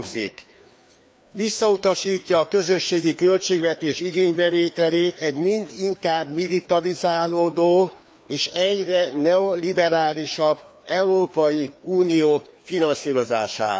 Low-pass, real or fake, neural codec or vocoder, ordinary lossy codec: none; fake; codec, 16 kHz, 2 kbps, FreqCodec, larger model; none